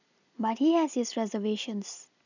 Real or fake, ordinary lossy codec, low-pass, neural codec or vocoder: real; none; 7.2 kHz; none